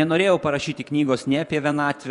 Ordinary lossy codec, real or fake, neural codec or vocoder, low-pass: MP3, 96 kbps; fake; vocoder, 24 kHz, 100 mel bands, Vocos; 10.8 kHz